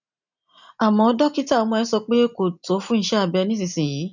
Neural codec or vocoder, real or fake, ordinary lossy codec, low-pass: none; real; none; 7.2 kHz